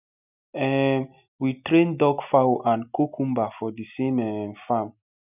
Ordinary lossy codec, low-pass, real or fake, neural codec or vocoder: none; 3.6 kHz; real; none